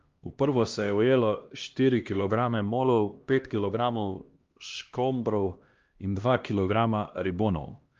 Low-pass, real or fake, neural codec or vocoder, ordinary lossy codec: 7.2 kHz; fake; codec, 16 kHz, 1 kbps, X-Codec, HuBERT features, trained on LibriSpeech; Opus, 32 kbps